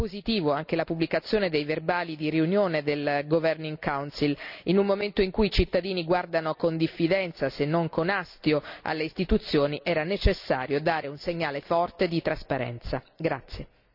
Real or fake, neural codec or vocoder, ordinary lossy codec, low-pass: real; none; none; 5.4 kHz